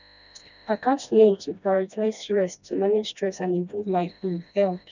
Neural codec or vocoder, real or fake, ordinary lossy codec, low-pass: codec, 16 kHz, 1 kbps, FreqCodec, smaller model; fake; none; 7.2 kHz